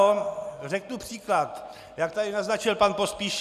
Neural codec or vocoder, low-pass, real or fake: none; 14.4 kHz; real